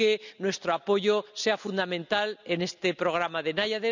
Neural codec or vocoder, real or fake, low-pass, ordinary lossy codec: none; real; 7.2 kHz; none